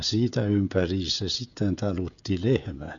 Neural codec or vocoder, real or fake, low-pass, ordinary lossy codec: codec, 16 kHz, 16 kbps, FreqCodec, smaller model; fake; 7.2 kHz; none